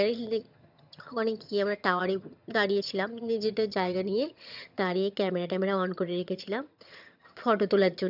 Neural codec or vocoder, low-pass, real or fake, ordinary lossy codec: vocoder, 22.05 kHz, 80 mel bands, HiFi-GAN; 5.4 kHz; fake; none